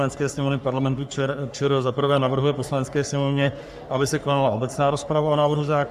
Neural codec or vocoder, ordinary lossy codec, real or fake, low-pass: codec, 44.1 kHz, 3.4 kbps, Pupu-Codec; AAC, 96 kbps; fake; 14.4 kHz